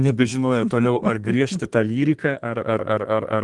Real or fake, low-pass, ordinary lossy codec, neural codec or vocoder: fake; 10.8 kHz; Opus, 24 kbps; codec, 32 kHz, 1.9 kbps, SNAC